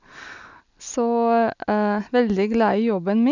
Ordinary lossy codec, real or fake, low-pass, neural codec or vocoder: none; real; 7.2 kHz; none